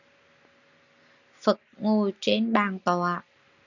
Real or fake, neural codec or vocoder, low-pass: real; none; 7.2 kHz